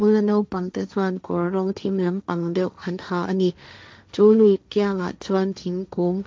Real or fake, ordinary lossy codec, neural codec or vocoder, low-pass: fake; none; codec, 16 kHz, 1.1 kbps, Voila-Tokenizer; none